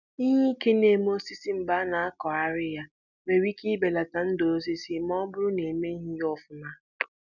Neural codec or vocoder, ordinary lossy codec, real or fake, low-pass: none; none; real; 7.2 kHz